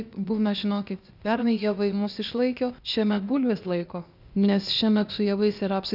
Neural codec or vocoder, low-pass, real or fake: codec, 16 kHz, 0.8 kbps, ZipCodec; 5.4 kHz; fake